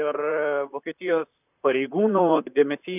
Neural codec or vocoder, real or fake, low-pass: vocoder, 44.1 kHz, 128 mel bands, Pupu-Vocoder; fake; 3.6 kHz